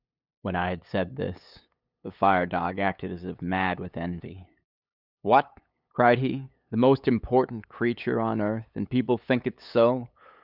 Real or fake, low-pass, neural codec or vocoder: fake; 5.4 kHz; codec, 16 kHz, 8 kbps, FunCodec, trained on LibriTTS, 25 frames a second